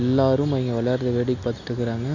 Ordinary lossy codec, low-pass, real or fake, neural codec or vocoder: none; 7.2 kHz; real; none